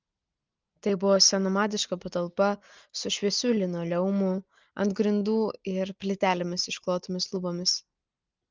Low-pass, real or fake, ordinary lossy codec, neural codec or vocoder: 7.2 kHz; real; Opus, 32 kbps; none